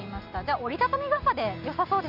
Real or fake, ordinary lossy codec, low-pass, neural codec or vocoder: real; none; 5.4 kHz; none